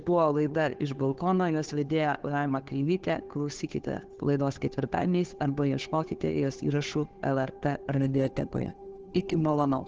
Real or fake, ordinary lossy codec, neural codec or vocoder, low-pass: fake; Opus, 16 kbps; codec, 16 kHz, 8 kbps, FunCodec, trained on Chinese and English, 25 frames a second; 7.2 kHz